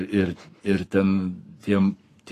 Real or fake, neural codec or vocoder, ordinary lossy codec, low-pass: fake; codec, 44.1 kHz, 7.8 kbps, Pupu-Codec; AAC, 48 kbps; 14.4 kHz